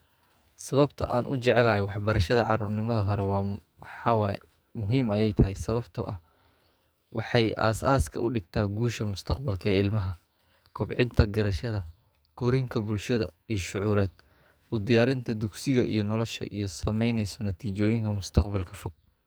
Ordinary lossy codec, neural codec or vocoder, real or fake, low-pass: none; codec, 44.1 kHz, 2.6 kbps, SNAC; fake; none